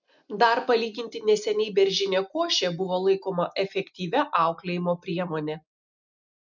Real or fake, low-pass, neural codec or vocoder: real; 7.2 kHz; none